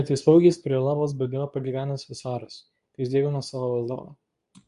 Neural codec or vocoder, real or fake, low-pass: codec, 24 kHz, 0.9 kbps, WavTokenizer, medium speech release version 2; fake; 10.8 kHz